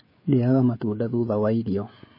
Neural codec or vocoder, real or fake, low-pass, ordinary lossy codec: codec, 16 kHz, 16 kbps, FreqCodec, smaller model; fake; 5.4 kHz; MP3, 24 kbps